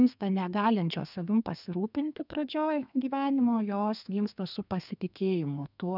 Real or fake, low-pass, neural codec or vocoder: fake; 5.4 kHz; codec, 32 kHz, 1.9 kbps, SNAC